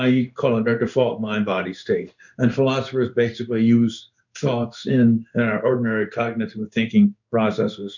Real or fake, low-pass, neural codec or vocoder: fake; 7.2 kHz; codec, 16 kHz in and 24 kHz out, 1 kbps, XY-Tokenizer